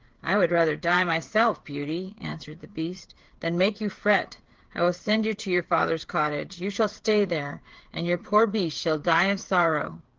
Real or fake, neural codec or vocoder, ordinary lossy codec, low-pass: fake; codec, 16 kHz, 8 kbps, FreqCodec, smaller model; Opus, 16 kbps; 7.2 kHz